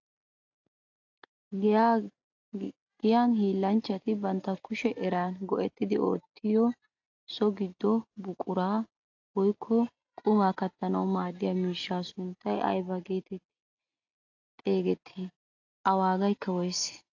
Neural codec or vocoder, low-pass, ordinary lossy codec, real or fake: none; 7.2 kHz; AAC, 48 kbps; real